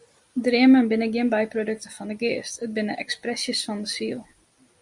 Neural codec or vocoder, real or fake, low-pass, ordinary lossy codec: none; real; 10.8 kHz; Opus, 64 kbps